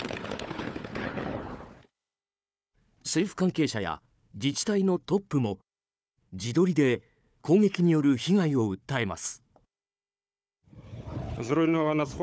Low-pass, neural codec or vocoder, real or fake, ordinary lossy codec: none; codec, 16 kHz, 4 kbps, FunCodec, trained on Chinese and English, 50 frames a second; fake; none